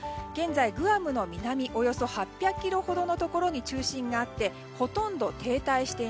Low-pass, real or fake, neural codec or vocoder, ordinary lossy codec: none; real; none; none